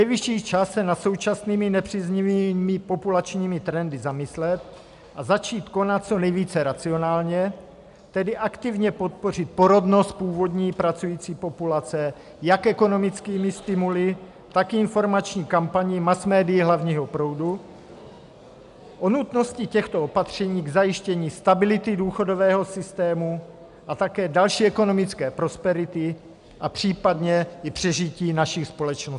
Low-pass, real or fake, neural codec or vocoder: 10.8 kHz; real; none